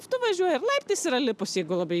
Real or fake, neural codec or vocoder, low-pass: real; none; 14.4 kHz